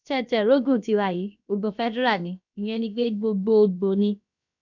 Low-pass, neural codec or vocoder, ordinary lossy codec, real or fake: 7.2 kHz; codec, 16 kHz, about 1 kbps, DyCAST, with the encoder's durations; none; fake